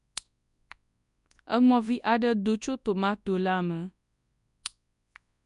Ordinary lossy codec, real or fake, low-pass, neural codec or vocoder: none; fake; 10.8 kHz; codec, 24 kHz, 0.9 kbps, WavTokenizer, large speech release